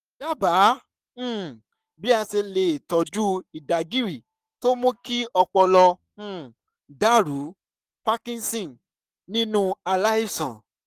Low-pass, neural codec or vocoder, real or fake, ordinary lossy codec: 14.4 kHz; codec, 44.1 kHz, 7.8 kbps, Pupu-Codec; fake; Opus, 32 kbps